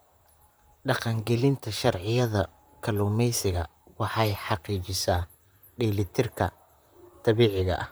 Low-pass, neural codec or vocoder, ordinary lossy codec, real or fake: none; vocoder, 44.1 kHz, 128 mel bands, Pupu-Vocoder; none; fake